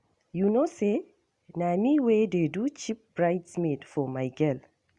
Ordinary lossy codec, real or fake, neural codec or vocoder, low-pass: Opus, 64 kbps; real; none; 10.8 kHz